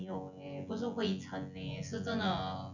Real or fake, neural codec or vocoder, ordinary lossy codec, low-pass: fake; vocoder, 24 kHz, 100 mel bands, Vocos; none; 7.2 kHz